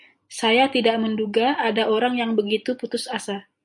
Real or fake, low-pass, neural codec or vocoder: real; 10.8 kHz; none